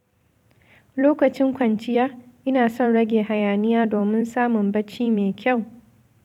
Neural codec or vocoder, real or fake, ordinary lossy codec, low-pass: vocoder, 44.1 kHz, 128 mel bands every 256 samples, BigVGAN v2; fake; none; 19.8 kHz